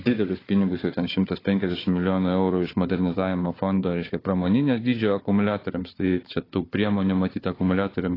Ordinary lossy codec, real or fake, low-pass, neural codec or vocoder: AAC, 24 kbps; fake; 5.4 kHz; codec, 16 kHz, 4 kbps, FunCodec, trained on Chinese and English, 50 frames a second